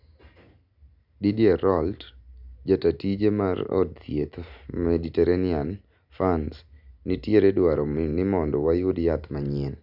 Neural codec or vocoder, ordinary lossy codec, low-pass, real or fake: none; none; 5.4 kHz; real